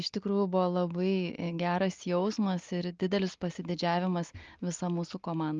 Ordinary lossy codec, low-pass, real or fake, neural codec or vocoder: Opus, 32 kbps; 7.2 kHz; real; none